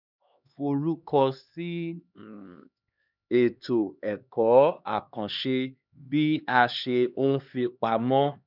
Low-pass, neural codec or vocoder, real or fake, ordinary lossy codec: 5.4 kHz; codec, 16 kHz, 2 kbps, X-Codec, HuBERT features, trained on LibriSpeech; fake; none